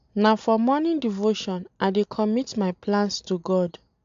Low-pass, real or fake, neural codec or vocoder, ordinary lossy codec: 7.2 kHz; real; none; AAC, 64 kbps